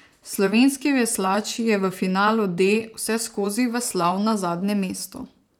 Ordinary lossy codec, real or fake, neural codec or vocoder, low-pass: none; fake; vocoder, 44.1 kHz, 128 mel bands, Pupu-Vocoder; 19.8 kHz